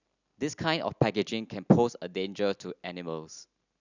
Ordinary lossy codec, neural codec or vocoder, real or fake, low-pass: none; none; real; 7.2 kHz